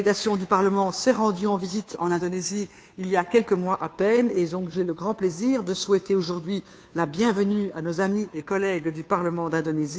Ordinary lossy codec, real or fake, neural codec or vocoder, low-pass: none; fake; codec, 16 kHz, 2 kbps, FunCodec, trained on Chinese and English, 25 frames a second; none